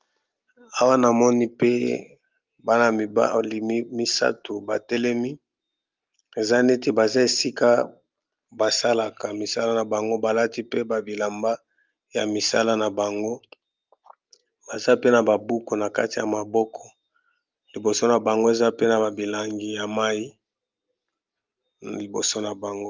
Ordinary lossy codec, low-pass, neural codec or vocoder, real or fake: Opus, 24 kbps; 7.2 kHz; none; real